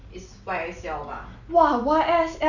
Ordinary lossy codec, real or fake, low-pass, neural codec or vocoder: none; real; 7.2 kHz; none